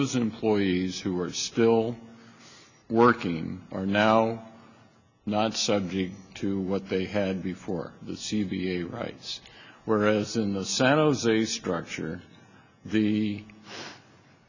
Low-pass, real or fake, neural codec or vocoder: 7.2 kHz; real; none